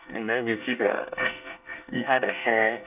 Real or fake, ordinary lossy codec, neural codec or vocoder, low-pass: fake; none; codec, 24 kHz, 1 kbps, SNAC; 3.6 kHz